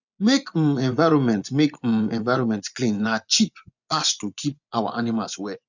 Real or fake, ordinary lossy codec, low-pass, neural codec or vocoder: fake; none; 7.2 kHz; vocoder, 24 kHz, 100 mel bands, Vocos